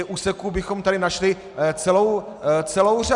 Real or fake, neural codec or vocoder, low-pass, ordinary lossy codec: real; none; 10.8 kHz; Opus, 64 kbps